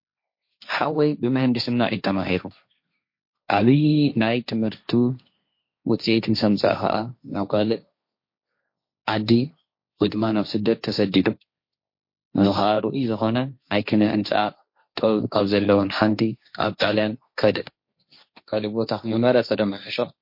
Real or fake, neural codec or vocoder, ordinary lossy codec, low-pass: fake; codec, 16 kHz, 1.1 kbps, Voila-Tokenizer; MP3, 32 kbps; 5.4 kHz